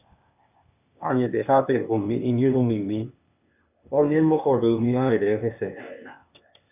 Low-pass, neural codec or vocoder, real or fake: 3.6 kHz; codec, 16 kHz, 0.8 kbps, ZipCodec; fake